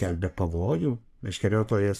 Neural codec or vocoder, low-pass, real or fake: codec, 44.1 kHz, 3.4 kbps, Pupu-Codec; 14.4 kHz; fake